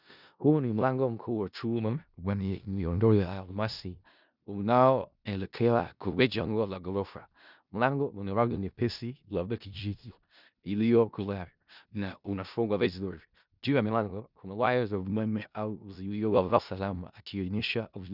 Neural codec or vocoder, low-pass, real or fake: codec, 16 kHz in and 24 kHz out, 0.4 kbps, LongCat-Audio-Codec, four codebook decoder; 5.4 kHz; fake